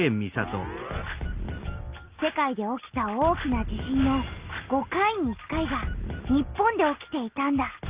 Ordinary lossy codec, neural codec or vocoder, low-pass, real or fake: Opus, 24 kbps; none; 3.6 kHz; real